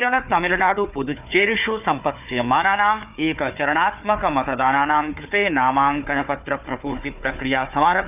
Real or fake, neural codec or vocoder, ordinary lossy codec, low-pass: fake; codec, 16 kHz, 4 kbps, FunCodec, trained on Chinese and English, 50 frames a second; none; 3.6 kHz